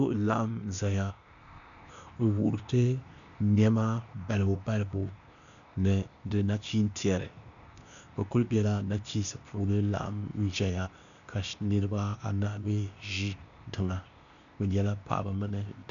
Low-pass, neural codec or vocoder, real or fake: 7.2 kHz; codec, 16 kHz, 0.8 kbps, ZipCodec; fake